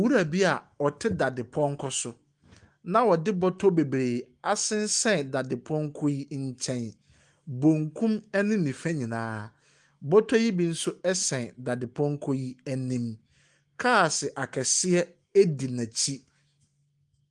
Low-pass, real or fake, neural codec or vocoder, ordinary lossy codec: 10.8 kHz; fake; autoencoder, 48 kHz, 128 numbers a frame, DAC-VAE, trained on Japanese speech; Opus, 24 kbps